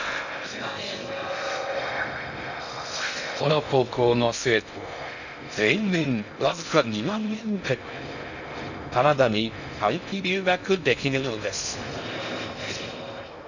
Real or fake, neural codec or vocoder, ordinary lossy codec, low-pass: fake; codec, 16 kHz in and 24 kHz out, 0.6 kbps, FocalCodec, streaming, 2048 codes; none; 7.2 kHz